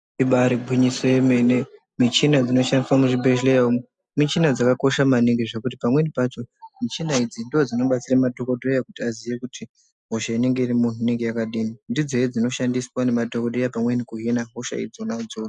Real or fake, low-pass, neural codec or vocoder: real; 9.9 kHz; none